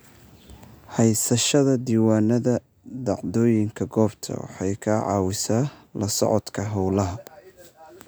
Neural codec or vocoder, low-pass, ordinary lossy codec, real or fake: none; none; none; real